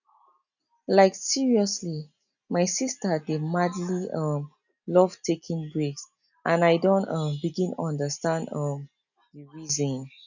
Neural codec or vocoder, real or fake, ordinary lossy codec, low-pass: none; real; none; 7.2 kHz